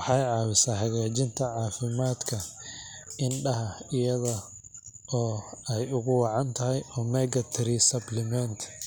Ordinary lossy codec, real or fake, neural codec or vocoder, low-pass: none; real; none; none